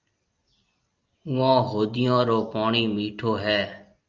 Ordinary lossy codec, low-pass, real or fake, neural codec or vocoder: Opus, 24 kbps; 7.2 kHz; real; none